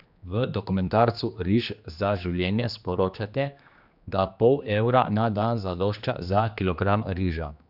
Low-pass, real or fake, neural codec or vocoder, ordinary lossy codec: 5.4 kHz; fake; codec, 16 kHz, 2 kbps, X-Codec, HuBERT features, trained on general audio; none